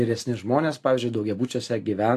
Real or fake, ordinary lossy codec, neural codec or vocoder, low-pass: real; AAC, 64 kbps; none; 14.4 kHz